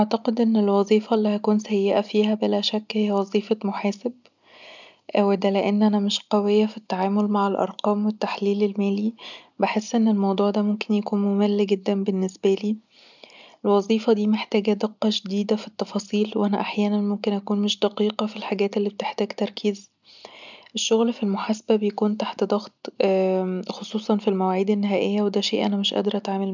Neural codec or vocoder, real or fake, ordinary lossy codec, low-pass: none; real; none; 7.2 kHz